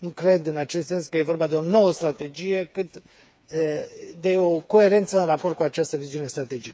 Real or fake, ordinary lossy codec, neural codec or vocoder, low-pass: fake; none; codec, 16 kHz, 4 kbps, FreqCodec, smaller model; none